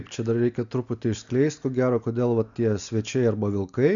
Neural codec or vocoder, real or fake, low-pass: none; real; 7.2 kHz